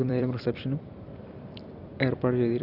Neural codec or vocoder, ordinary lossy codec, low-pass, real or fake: vocoder, 44.1 kHz, 128 mel bands every 256 samples, BigVGAN v2; Opus, 64 kbps; 5.4 kHz; fake